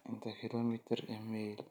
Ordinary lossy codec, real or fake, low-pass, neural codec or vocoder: none; real; none; none